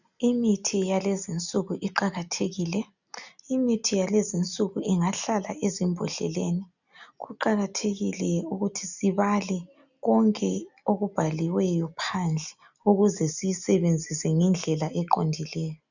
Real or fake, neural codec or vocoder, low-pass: real; none; 7.2 kHz